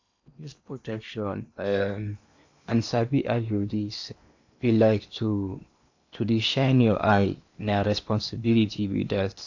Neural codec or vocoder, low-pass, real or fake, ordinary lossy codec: codec, 16 kHz in and 24 kHz out, 0.8 kbps, FocalCodec, streaming, 65536 codes; 7.2 kHz; fake; AAC, 48 kbps